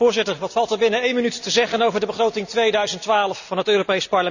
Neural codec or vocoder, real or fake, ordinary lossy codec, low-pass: none; real; none; 7.2 kHz